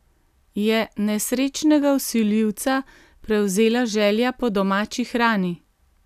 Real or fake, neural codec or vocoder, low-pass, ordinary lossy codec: real; none; 14.4 kHz; none